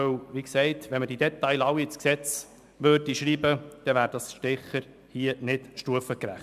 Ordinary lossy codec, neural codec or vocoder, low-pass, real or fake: MP3, 96 kbps; none; 14.4 kHz; real